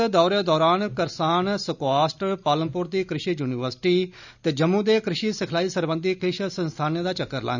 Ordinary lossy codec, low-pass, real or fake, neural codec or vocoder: none; 7.2 kHz; real; none